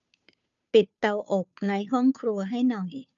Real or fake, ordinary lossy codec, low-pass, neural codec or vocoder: fake; none; 7.2 kHz; codec, 16 kHz, 2 kbps, FunCodec, trained on Chinese and English, 25 frames a second